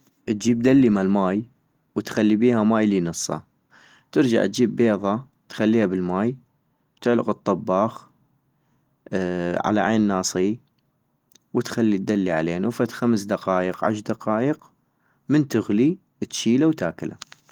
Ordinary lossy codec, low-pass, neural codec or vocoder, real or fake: Opus, 24 kbps; 19.8 kHz; none; real